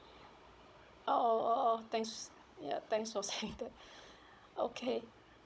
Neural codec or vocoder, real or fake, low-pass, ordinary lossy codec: codec, 16 kHz, 16 kbps, FunCodec, trained on Chinese and English, 50 frames a second; fake; none; none